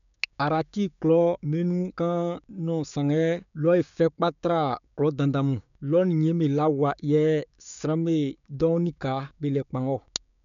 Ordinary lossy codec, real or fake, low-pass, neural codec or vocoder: none; fake; 7.2 kHz; codec, 16 kHz, 6 kbps, DAC